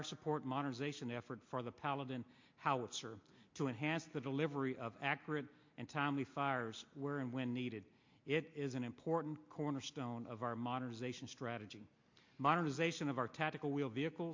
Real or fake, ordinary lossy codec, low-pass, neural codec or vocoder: real; MP3, 48 kbps; 7.2 kHz; none